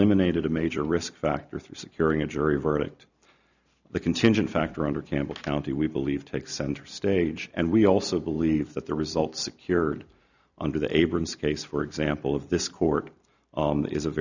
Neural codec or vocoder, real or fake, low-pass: none; real; 7.2 kHz